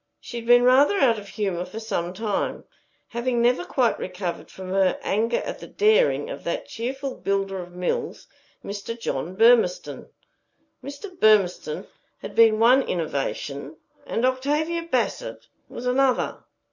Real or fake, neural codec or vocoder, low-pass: real; none; 7.2 kHz